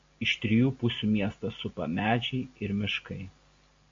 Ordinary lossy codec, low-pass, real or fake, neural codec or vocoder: AAC, 48 kbps; 7.2 kHz; real; none